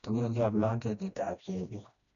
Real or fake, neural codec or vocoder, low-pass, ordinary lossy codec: fake; codec, 16 kHz, 1 kbps, FreqCodec, smaller model; 7.2 kHz; none